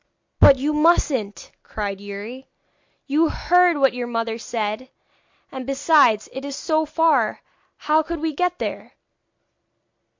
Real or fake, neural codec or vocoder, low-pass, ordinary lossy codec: real; none; 7.2 kHz; MP3, 48 kbps